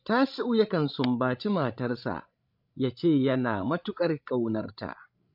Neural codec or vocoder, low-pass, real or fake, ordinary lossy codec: codec, 16 kHz, 16 kbps, FreqCodec, larger model; 5.4 kHz; fake; AAC, 48 kbps